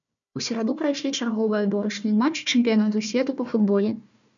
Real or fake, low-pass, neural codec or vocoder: fake; 7.2 kHz; codec, 16 kHz, 1 kbps, FunCodec, trained on Chinese and English, 50 frames a second